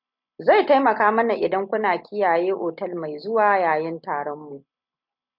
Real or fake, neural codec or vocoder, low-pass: real; none; 5.4 kHz